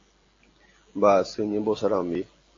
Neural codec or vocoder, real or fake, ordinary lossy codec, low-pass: none; real; AAC, 32 kbps; 7.2 kHz